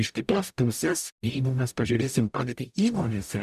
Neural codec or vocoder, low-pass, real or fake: codec, 44.1 kHz, 0.9 kbps, DAC; 14.4 kHz; fake